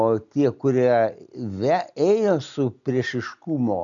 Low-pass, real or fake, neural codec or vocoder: 7.2 kHz; real; none